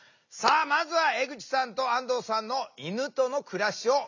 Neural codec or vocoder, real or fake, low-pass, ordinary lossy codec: none; real; 7.2 kHz; none